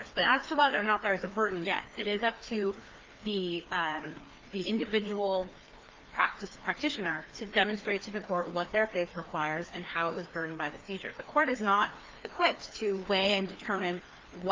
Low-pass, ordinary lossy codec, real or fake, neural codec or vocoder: 7.2 kHz; Opus, 24 kbps; fake; codec, 16 kHz, 2 kbps, FreqCodec, larger model